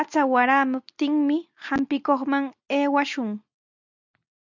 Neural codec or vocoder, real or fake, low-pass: none; real; 7.2 kHz